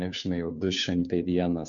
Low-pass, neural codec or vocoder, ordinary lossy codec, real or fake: 7.2 kHz; codec, 16 kHz, 2 kbps, FunCodec, trained on Chinese and English, 25 frames a second; MP3, 64 kbps; fake